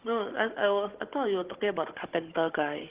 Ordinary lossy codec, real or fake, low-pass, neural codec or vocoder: Opus, 16 kbps; fake; 3.6 kHz; codec, 16 kHz, 16 kbps, FunCodec, trained on Chinese and English, 50 frames a second